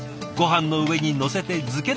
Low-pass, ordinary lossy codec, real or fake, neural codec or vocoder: none; none; real; none